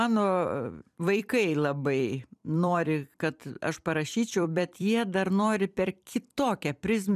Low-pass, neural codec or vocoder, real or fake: 14.4 kHz; vocoder, 44.1 kHz, 128 mel bands every 256 samples, BigVGAN v2; fake